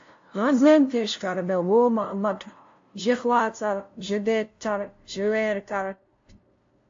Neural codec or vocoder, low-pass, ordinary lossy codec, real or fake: codec, 16 kHz, 0.5 kbps, FunCodec, trained on LibriTTS, 25 frames a second; 7.2 kHz; AAC, 48 kbps; fake